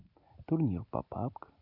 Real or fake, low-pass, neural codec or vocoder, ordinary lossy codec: real; 5.4 kHz; none; none